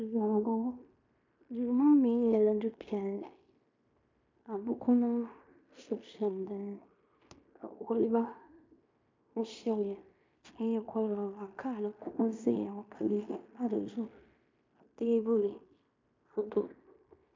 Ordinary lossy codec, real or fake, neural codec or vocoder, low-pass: MP3, 64 kbps; fake; codec, 16 kHz in and 24 kHz out, 0.9 kbps, LongCat-Audio-Codec, fine tuned four codebook decoder; 7.2 kHz